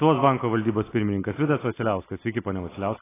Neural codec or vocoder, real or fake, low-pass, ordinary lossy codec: none; real; 3.6 kHz; AAC, 16 kbps